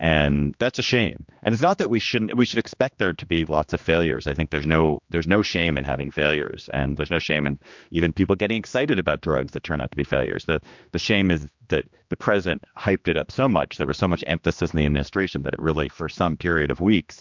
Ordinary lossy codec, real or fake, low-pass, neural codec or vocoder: MP3, 64 kbps; fake; 7.2 kHz; codec, 16 kHz, 2 kbps, X-Codec, HuBERT features, trained on general audio